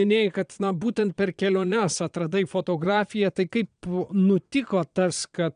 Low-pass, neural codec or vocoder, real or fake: 9.9 kHz; vocoder, 22.05 kHz, 80 mel bands, Vocos; fake